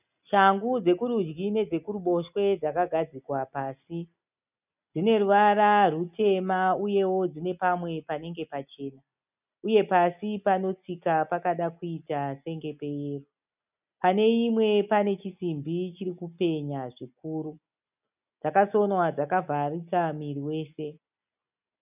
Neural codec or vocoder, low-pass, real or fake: none; 3.6 kHz; real